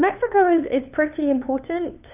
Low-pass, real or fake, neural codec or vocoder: 3.6 kHz; fake; codec, 16 kHz, 2 kbps, FunCodec, trained on LibriTTS, 25 frames a second